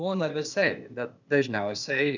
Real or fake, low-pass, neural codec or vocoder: fake; 7.2 kHz; codec, 16 kHz, 0.8 kbps, ZipCodec